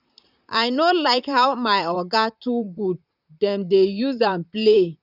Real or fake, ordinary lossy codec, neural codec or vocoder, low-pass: fake; none; vocoder, 44.1 kHz, 128 mel bands, Pupu-Vocoder; 5.4 kHz